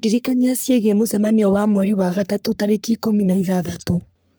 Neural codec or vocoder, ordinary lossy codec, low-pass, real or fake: codec, 44.1 kHz, 3.4 kbps, Pupu-Codec; none; none; fake